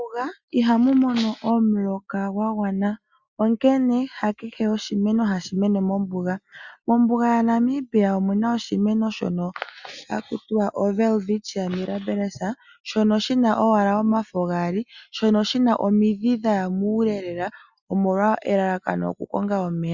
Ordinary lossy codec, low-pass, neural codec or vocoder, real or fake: Opus, 64 kbps; 7.2 kHz; none; real